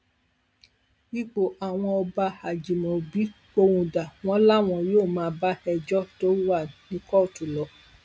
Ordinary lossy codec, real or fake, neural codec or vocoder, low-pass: none; real; none; none